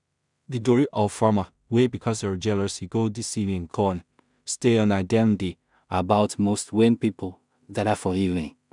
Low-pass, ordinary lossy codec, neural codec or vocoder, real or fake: 10.8 kHz; none; codec, 16 kHz in and 24 kHz out, 0.4 kbps, LongCat-Audio-Codec, two codebook decoder; fake